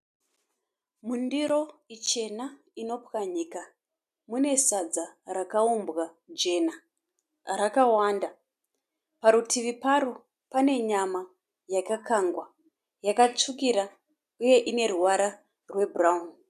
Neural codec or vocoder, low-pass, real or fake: none; 14.4 kHz; real